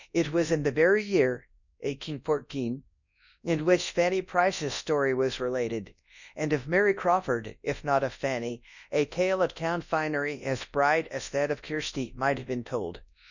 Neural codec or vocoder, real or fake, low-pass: codec, 24 kHz, 0.9 kbps, WavTokenizer, large speech release; fake; 7.2 kHz